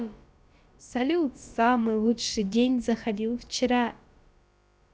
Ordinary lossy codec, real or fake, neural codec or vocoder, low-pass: none; fake; codec, 16 kHz, about 1 kbps, DyCAST, with the encoder's durations; none